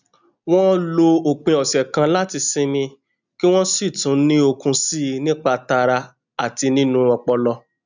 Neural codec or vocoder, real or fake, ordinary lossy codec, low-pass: none; real; none; 7.2 kHz